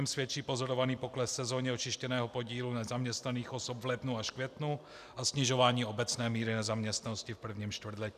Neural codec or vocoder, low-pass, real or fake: none; 14.4 kHz; real